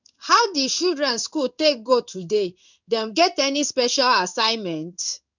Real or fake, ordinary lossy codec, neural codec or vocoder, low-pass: fake; none; codec, 16 kHz in and 24 kHz out, 1 kbps, XY-Tokenizer; 7.2 kHz